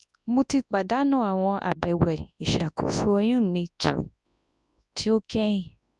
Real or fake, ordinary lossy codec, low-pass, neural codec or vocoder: fake; MP3, 96 kbps; 10.8 kHz; codec, 24 kHz, 0.9 kbps, WavTokenizer, large speech release